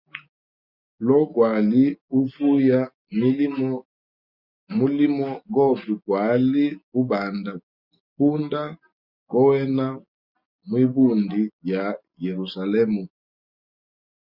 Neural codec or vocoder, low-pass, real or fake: none; 5.4 kHz; real